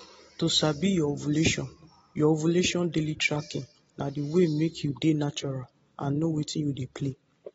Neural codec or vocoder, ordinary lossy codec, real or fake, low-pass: none; AAC, 24 kbps; real; 19.8 kHz